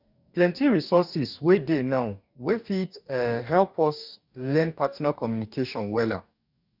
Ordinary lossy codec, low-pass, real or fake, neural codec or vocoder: none; 5.4 kHz; fake; codec, 44.1 kHz, 2.6 kbps, DAC